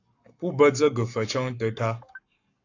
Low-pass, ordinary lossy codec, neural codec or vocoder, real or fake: 7.2 kHz; AAC, 48 kbps; codec, 44.1 kHz, 7.8 kbps, Pupu-Codec; fake